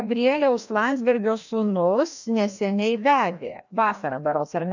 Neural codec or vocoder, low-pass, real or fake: codec, 16 kHz, 1 kbps, FreqCodec, larger model; 7.2 kHz; fake